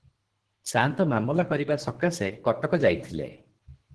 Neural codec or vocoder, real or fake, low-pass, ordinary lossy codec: codec, 24 kHz, 3 kbps, HILCodec; fake; 10.8 kHz; Opus, 16 kbps